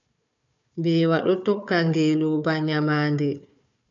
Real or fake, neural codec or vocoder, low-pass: fake; codec, 16 kHz, 4 kbps, FunCodec, trained on Chinese and English, 50 frames a second; 7.2 kHz